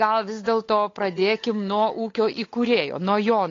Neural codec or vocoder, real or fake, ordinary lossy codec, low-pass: codec, 16 kHz, 8 kbps, FunCodec, trained on Chinese and English, 25 frames a second; fake; AAC, 32 kbps; 7.2 kHz